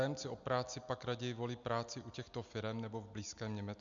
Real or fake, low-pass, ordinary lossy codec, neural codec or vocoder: real; 7.2 kHz; MP3, 64 kbps; none